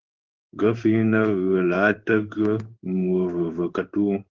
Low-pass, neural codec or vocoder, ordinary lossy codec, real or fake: 7.2 kHz; codec, 16 kHz in and 24 kHz out, 1 kbps, XY-Tokenizer; Opus, 16 kbps; fake